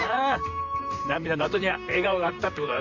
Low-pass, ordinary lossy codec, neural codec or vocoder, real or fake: 7.2 kHz; none; vocoder, 44.1 kHz, 128 mel bands, Pupu-Vocoder; fake